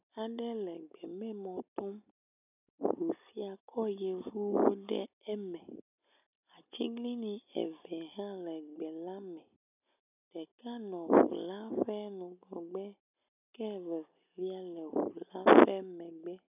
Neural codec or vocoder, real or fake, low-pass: none; real; 3.6 kHz